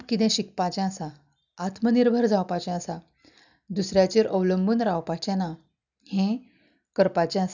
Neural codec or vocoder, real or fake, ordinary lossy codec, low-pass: none; real; none; 7.2 kHz